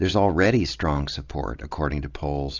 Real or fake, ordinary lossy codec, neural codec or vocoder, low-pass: real; AAC, 48 kbps; none; 7.2 kHz